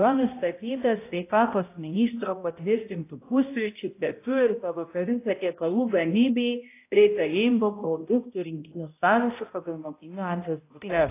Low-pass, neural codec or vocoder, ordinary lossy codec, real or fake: 3.6 kHz; codec, 16 kHz, 0.5 kbps, X-Codec, HuBERT features, trained on balanced general audio; AAC, 24 kbps; fake